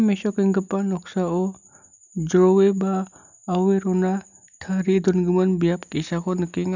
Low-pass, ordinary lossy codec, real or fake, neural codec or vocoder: 7.2 kHz; none; real; none